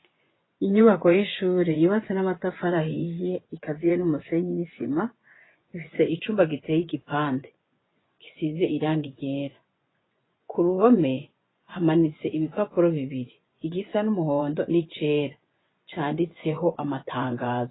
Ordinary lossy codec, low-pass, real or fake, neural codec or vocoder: AAC, 16 kbps; 7.2 kHz; fake; vocoder, 24 kHz, 100 mel bands, Vocos